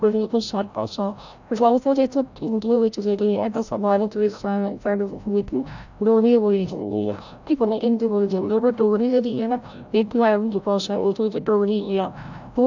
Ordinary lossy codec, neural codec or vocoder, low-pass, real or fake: none; codec, 16 kHz, 0.5 kbps, FreqCodec, larger model; 7.2 kHz; fake